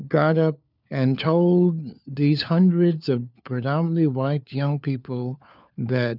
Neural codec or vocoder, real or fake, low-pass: codec, 16 kHz, 4 kbps, FunCodec, trained on LibriTTS, 50 frames a second; fake; 5.4 kHz